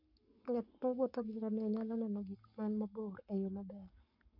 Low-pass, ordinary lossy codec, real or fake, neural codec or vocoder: 5.4 kHz; none; fake; codec, 44.1 kHz, 7.8 kbps, Pupu-Codec